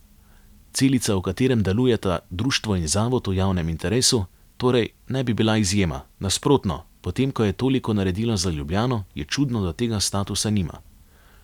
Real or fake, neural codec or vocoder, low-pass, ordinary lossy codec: real; none; 19.8 kHz; none